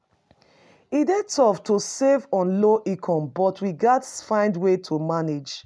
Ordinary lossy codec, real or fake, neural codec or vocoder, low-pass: none; real; none; none